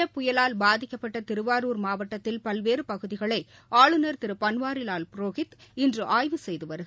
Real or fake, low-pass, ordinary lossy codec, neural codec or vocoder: real; none; none; none